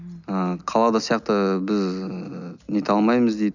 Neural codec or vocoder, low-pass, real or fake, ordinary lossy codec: none; 7.2 kHz; real; none